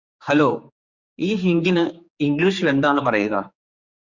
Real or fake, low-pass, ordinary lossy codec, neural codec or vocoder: fake; 7.2 kHz; Opus, 64 kbps; codec, 16 kHz, 4 kbps, X-Codec, HuBERT features, trained on general audio